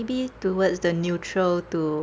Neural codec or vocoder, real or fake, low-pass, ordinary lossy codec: none; real; none; none